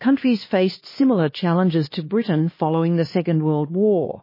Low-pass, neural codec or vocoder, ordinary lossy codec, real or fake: 5.4 kHz; codec, 16 kHz, 2 kbps, X-Codec, HuBERT features, trained on LibriSpeech; MP3, 24 kbps; fake